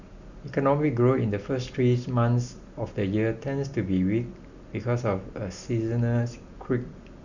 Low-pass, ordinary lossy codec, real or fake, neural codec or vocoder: 7.2 kHz; none; real; none